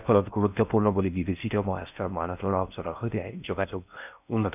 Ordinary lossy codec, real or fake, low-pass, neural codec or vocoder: none; fake; 3.6 kHz; codec, 16 kHz in and 24 kHz out, 0.6 kbps, FocalCodec, streaming, 4096 codes